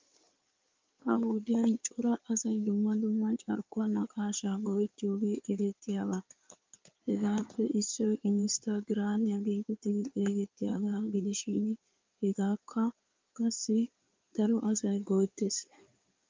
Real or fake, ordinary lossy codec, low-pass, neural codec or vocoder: fake; Opus, 24 kbps; 7.2 kHz; codec, 16 kHz in and 24 kHz out, 2.2 kbps, FireRedTTS-2 codec